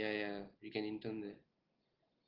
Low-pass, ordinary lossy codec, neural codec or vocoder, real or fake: 5.4 kHz; Opus, 16 kbps; none; real